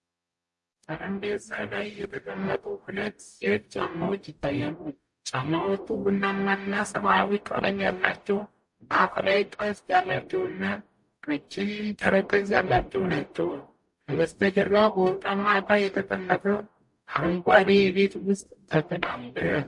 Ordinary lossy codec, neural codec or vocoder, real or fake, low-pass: MP3, 48 kbps; codec, 44.1 kHz, 0.9 kbps, DAC; fake; 10.8 kHz